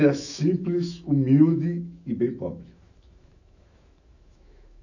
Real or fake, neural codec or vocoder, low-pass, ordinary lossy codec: fake; autoencoder, 48 kHz, 128 numbers a frame, DAC-VAE, trained on Japanese speech; 7.2 kHz; none